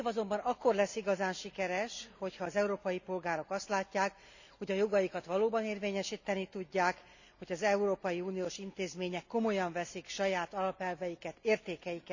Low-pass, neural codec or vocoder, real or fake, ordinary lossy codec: 7.2 kHz; none; real; none